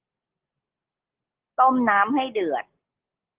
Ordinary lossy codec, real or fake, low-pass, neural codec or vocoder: Opus, 16 kbps; real; 3.6 kHz; none